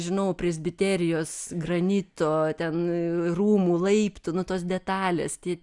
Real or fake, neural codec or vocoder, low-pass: real; none; 10.8 kHz